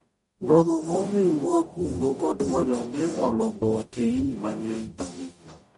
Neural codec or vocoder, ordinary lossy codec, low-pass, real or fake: codec, 44.1 kHz, 0.9 kbps, DAC; MP3, 48 kbps; 19.8 kHz; fake